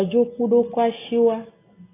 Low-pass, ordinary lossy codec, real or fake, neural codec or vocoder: 3.6 kHz; AAC, 16 kbps; real; none